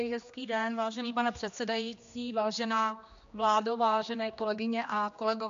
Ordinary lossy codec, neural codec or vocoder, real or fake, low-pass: MP3, 64 kbps; codec, 16 kHz, 2 kbps, X-Codec, HuBERT features, trained on general audio; fake; 7.2 kHz